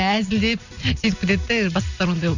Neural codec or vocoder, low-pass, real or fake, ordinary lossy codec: none; 7.2 kHz; real; none